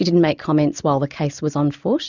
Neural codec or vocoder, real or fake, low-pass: none; real; 7.2 kHz